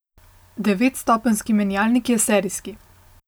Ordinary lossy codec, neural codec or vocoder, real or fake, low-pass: none; none; real; none